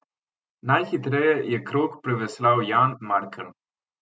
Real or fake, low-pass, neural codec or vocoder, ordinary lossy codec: real; none; none; none